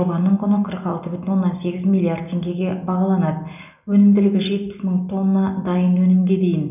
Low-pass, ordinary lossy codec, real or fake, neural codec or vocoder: 3.6 kHz; none; real; none